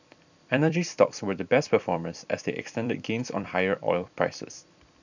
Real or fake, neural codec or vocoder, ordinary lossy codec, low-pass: fake; vocoder, 44.1 kHz, 128 mel bands every 256 samples, BigVGAN v2; none; 7.2 kHz